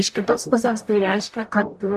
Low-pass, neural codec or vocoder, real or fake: 14.4 kHz; codec, 44.1 kHz, 0.9 kbps, DAC; fake